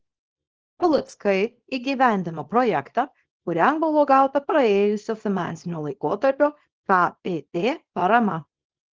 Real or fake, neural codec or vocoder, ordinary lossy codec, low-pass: fake; codec, 24 kHz, 0.9 kbps, WavTokenizer, small release; Opus, 16 kbps; 7.2 kHz